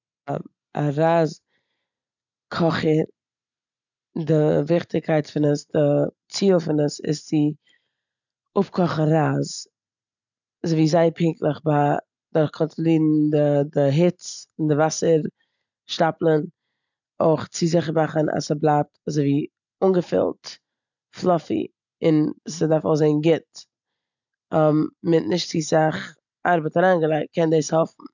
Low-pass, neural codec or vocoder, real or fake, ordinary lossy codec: 7.2 kHz; none; real; none